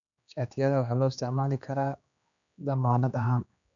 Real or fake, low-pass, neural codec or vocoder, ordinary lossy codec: fake; 7.2 kHz; codec, 16 kHz, 2 kbps, X-Codec, HuBERT features, trained on general audio; none